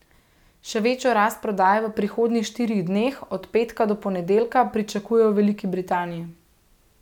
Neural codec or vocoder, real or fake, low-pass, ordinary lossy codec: none; real; 19.8 kHz; none